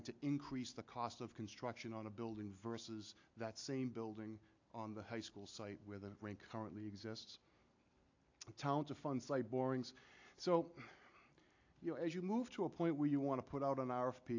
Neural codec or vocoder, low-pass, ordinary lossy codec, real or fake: none; 7.2 kHz; AAC, 48 kbps; real